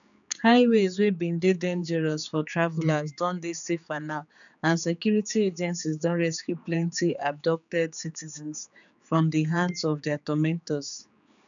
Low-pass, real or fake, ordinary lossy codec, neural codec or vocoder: 7.2 kHz; fake; none; codec, 16 kHz, 4 kbps, X-Codec, HuBERT features, trained on general audio